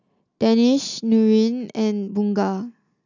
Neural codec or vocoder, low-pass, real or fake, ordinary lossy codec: none; 7.2 kHz; real; none